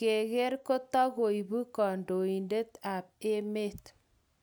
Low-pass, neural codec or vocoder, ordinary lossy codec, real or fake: none; none; none; real